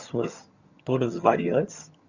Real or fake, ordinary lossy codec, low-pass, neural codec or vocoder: fake; Opus, 64 kbps; 7.2 kHz; vocoder, 22.05 kHz, 80 mel bands, HiFi-GAN